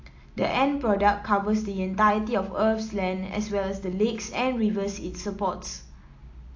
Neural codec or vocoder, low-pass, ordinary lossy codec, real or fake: none; 7.2 kHz; AAC, 48 kbps; real